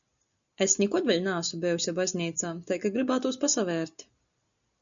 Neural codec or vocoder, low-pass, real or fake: none; 7.2 kHz; real